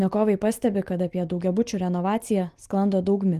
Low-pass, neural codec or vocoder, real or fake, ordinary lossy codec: 14.4 kHz; none; real; Opus, 24 kbps